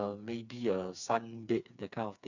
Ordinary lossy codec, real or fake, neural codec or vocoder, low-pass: none; fake; codec, 16 kHz, 4 kbps, FreqCodec, smaller model; 7.2 kHz